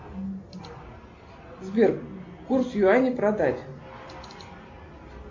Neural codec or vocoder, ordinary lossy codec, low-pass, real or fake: none; MP3, 48 kbps; 7.2 kHz; real